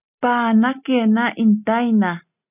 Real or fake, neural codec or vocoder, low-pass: real; none; 3.6 kHz